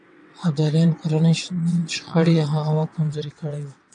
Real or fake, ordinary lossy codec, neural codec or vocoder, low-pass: fake; MP3, 64 kbps; vocoder, 22.05 kHz, 80 mel bands, WaveNeXt; 9.9 kHz